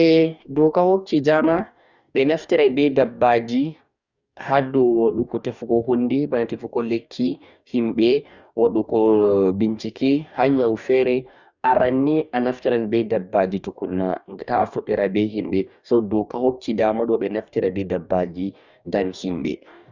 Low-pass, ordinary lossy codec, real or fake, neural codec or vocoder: 7.2 kHz; Opus, 64 kbps; fake; codec, 44.1 kHz, 2.6 kbps, DAC